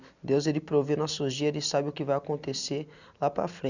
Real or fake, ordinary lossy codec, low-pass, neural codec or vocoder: real; none; 7.2 kHz; none